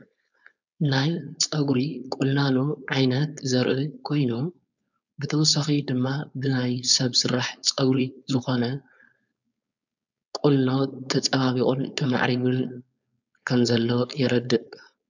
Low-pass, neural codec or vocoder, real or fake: 7.2 kHz; codec, 16 kHz, 4.8 kbps, FACodec; fake